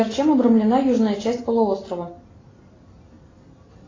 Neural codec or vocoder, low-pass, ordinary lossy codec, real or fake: none; 7.2 kHz; AAC, 32 kbps; real